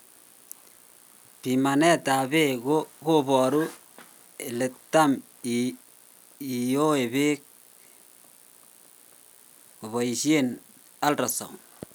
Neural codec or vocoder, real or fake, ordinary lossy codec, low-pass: none; real; none; none